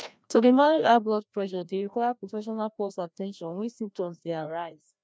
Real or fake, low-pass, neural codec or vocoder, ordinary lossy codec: fake; none; codec, 16 kHz, 1 kbps, FreqCodec, larger model; none